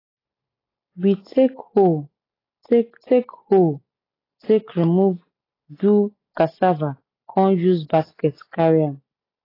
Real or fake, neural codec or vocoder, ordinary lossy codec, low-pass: real; none; AAC, 24 kbps; 5.4 kHz